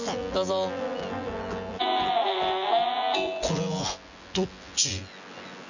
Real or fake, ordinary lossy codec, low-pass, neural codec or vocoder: fake; none; 7.2 kHz; vocoder, 24 kHz, 100 mel bands, Vocos